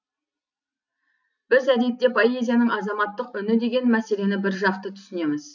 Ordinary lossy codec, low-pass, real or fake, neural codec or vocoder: none; 7.2 kHz; real; none